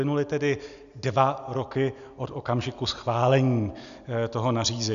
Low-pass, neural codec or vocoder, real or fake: 7.2 kHz; none; real